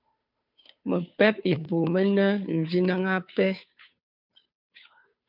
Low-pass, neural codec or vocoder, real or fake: 5.4 kHz; codec, 16 kHz, 2 kbps, FunCodec, trained on Chinese and English, 25 frames a second; fake